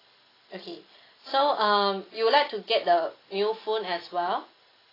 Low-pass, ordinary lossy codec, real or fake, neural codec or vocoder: 5.4 kHz; AAC, 24 kbps; real; none